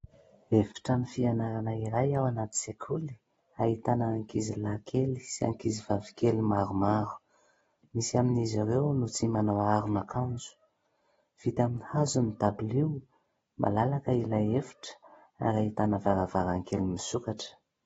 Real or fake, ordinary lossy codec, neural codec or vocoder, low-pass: real; AAC, 24 kbps; none; 19.8 kHz